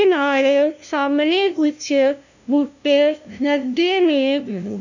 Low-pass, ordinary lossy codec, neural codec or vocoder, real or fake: 7.2 kHz; none; codec, 16 kHz, 0.5 kbps, FunCodec, trained on LibriTTS, 25 frames a second; fake